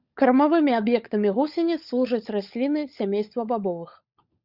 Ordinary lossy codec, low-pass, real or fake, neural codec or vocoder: Opus, 64 kbps; 5.4 kHz; fake; codec, 16 kHz, 16 kbps, FunCodec, trained on LibriTTS, 50 frames a second